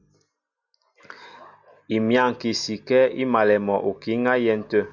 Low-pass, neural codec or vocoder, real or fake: 7.2 kHz; none; real